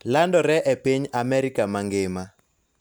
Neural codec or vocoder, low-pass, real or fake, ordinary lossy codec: none; none; real; none